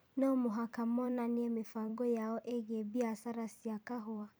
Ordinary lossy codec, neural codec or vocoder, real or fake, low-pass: none; vocoder, 44.1 kHz, 128 mel bands every 256 samples, BigVGAN v2; fake; none